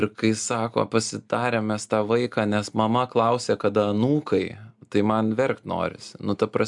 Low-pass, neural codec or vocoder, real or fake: 10.8 kHz; none; real